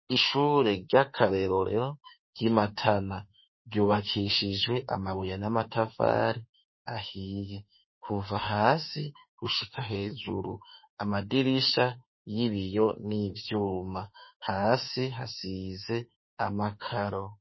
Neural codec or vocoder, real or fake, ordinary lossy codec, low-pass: autoencoder, 48 kHz, 32 numbers a frame, DAC-VAE, trained on Japanese speech; fake; MP3, 24 kbps; 7.2 kHz